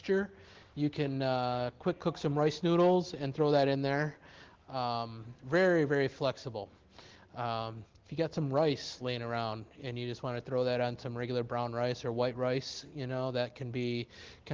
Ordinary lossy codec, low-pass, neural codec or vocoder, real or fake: Opus, 16 kbps; 7.2 kHz; none; real